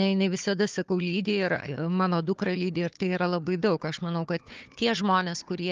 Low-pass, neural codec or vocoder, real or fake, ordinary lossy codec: 7.2 kHz; codec, 16 kHz, 4 kbps, FunCodec, trained on Chinese and English, 50 frames a second; fake; Opus, 32 kbps